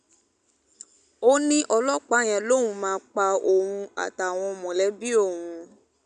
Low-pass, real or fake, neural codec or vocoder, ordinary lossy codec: 9.9 kHz; real; none; none